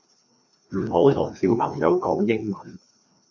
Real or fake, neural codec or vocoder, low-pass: fake; codec, 16 kHz, 2 kbps, FreqCodec, larger model; 7.2 kHz